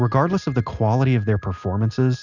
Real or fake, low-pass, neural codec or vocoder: real; 7.2 kHz; none